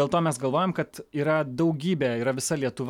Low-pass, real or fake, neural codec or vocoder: 19.8 kHz; real; none